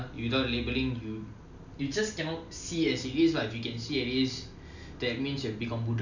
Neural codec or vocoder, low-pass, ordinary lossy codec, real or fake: none; 7.2 kHz; none; real